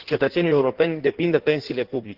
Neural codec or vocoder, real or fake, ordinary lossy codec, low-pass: codec, 16 kHz in and 24 kHz out, 1.1 kbps, FireRedTTS-2 codec; fake; Opus, 16 kbps; 5.4 kHz